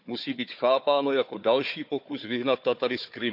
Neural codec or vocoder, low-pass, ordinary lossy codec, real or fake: codec, 16 kHz, 4 kbps, FunCodec, trained on Chinese and English, 50 frames a second; 5.4 kHz; none; fake